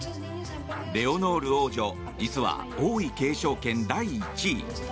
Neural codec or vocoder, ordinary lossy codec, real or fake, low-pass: none; none; real; none